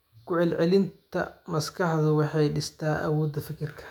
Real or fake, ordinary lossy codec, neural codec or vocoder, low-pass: fake; none; autoencoder, 48 kHz, 128 numbers a frame, DAC-VAE, trained on Japanese speech; 19.8 kHz